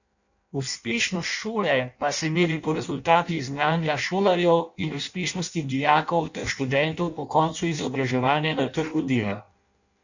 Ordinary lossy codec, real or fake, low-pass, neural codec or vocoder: none; fake; 7.2 kHz; codec, 16 kHz in and 24 kHz out, 0.6 kbps, FireRedTTS-2 codec